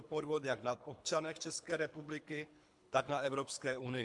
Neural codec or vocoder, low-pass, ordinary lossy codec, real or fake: codec, 24 kHz, 3 kbps, HILCodec; 10.8 kHz; AAC, 64 kbps; fake